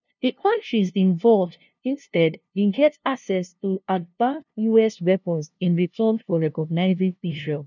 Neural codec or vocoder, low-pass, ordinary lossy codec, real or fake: codec, 16 kHz, 0.5 kbps, FunCodec, trained on LibriTTS, 25 frames a second; 7.2 kHz; none; fake